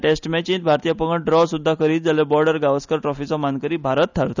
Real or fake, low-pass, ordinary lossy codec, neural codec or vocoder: real; 7.2 kHz; none; none